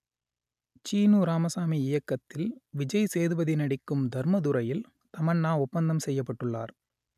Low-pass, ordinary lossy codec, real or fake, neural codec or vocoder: 14.4 kHz; none; real; none